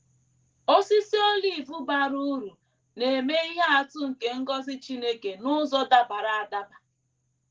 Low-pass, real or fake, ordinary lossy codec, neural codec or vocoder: 7.2 kHz; real; Opus, 16 kbps; none